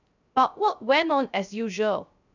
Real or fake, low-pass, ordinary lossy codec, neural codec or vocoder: fake; 7.2 kHz; none; codec, 16 kHz, 0.3 kbps, FocalCodec